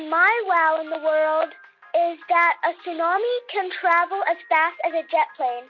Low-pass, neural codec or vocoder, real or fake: 7.2 kHz; none; real